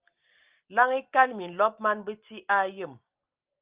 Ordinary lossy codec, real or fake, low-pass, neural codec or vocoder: Opus, 24 kbps; real; 3.6 kHz; none